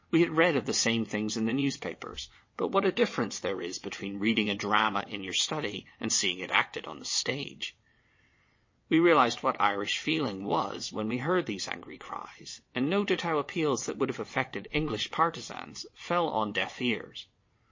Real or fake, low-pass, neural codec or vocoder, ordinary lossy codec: fake; 7.2 kHz; vocoder, 22.05 kHz, 80 mel bands, WaveNeXt; MP3, 32 kbps